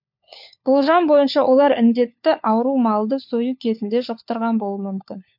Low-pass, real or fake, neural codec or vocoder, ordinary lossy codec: 5.4 kHz; fake; codec, 16 kHz, 4 kbps, FunCodec, trained on LibriTTS, 50 frames a second; MP3, 48 kbps